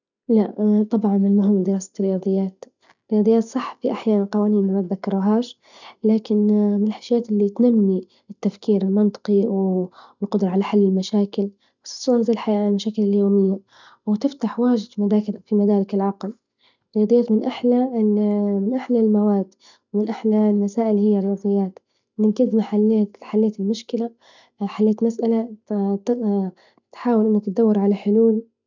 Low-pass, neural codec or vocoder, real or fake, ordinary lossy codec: 7.2 kHz; none; real; none